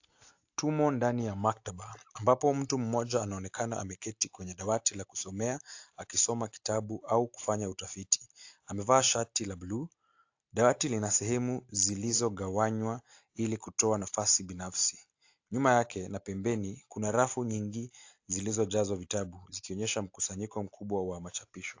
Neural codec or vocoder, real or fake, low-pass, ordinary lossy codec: none; real; 7.2 kHz; AAC, 48 kbps